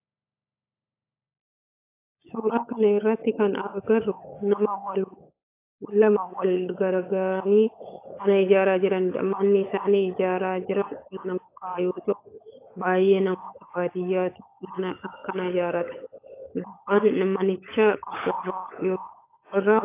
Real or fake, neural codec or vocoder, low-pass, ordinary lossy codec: fake; codec, 16 kHz, 16 kbps, FunCodec, trained on LibriTTS, 50 frames a second; 3.6 kHz; AAC, 24 kbps